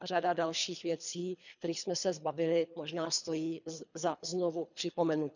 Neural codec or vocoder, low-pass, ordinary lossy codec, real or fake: codec, 24 kHz, 3 kbps, HILCodec; 7.2 kHz; none; fake